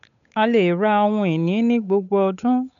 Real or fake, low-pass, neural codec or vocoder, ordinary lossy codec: fake; 7.2 kHz; codec, 16 kHz, 8 kbps, FunCodec, trained on Chinese and English, 25 frames a second; none